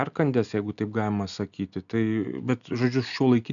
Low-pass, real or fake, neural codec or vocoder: 7.2 kHz; real; none